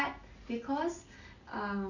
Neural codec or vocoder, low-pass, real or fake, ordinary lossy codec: none; 7.2 kHz; real; none